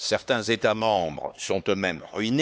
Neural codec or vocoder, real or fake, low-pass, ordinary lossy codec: codec, 16 kHz, 2 kbps, X-Codec, HuBERT features, trained on LibriSpeech; fake; none; none